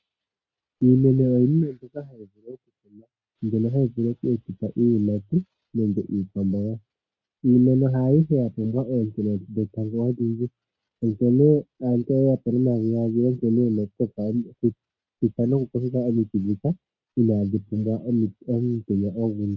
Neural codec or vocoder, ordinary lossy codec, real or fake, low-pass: none; MP3, 48 kbps; real; 7.2 kHz